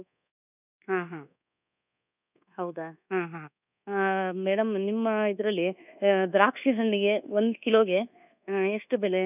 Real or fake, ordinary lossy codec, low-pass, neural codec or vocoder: fake; none; 3.6 kHz; codec, 24 kHz, 1.2 kbps, DualCodec